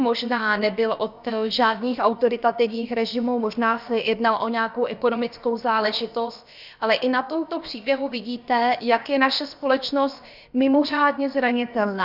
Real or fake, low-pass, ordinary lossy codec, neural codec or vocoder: fake; 5.4 kHz; Opus, 64 kbps; codec, 16 kHz, about 1 kbps, DyCAST, with the encoder's durations